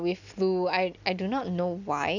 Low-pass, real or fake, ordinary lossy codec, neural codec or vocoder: 7.2 kHz; real; none; none